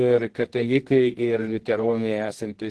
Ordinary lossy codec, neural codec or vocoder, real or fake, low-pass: Opus, 16 kbps; codec, 24 kHz, 0.9 kbps, WavTokenizer, medium music audio release; fake; 10.8 kHz